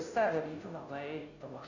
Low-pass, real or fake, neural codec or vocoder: 7.2 kHz; fake; codec, 16 kHz, 0.5 kbps, FunCodec, trained on Chinese and English, 25 frames a second